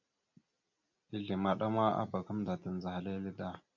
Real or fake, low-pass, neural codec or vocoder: real; 7.2 kHz; none